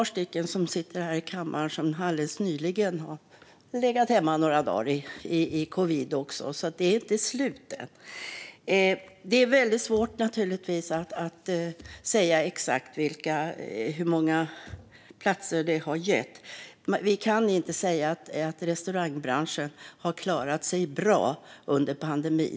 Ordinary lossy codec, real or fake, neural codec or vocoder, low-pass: none; real; none; none